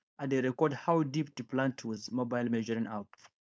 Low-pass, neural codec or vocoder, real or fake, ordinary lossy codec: none; codec, 16 kHz, 4.8 kbps, FACodec; fake; none